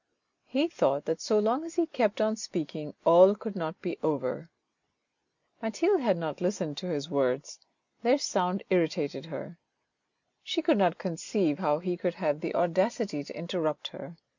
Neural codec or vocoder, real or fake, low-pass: none; real; 7.2 kHz